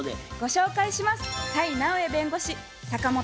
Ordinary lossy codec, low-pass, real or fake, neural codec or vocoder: none; none; real; none